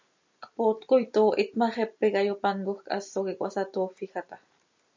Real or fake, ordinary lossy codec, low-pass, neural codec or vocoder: real; MP3, 64 kbps; 7.2 kHz; none